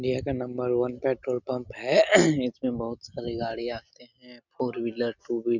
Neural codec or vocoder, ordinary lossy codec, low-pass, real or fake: none; none; 7.2 kHz; real